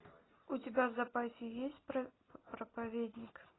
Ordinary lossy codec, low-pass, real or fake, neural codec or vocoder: AAC, 16 kbps; 7.2 kHz; real; none